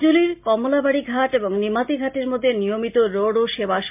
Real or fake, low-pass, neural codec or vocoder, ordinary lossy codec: real; 3.6 kHz; none; MP3, 32 kbps